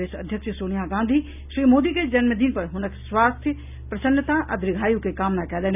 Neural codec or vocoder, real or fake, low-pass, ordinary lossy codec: none; real; 3.6 kHz; none